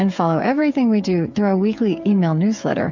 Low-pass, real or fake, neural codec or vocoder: 7.2 kHz; fake; codec, 16 kHz, 8 kbps, FreqCodec, smaller model